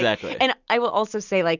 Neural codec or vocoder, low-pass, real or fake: none; 7.2 kHz; real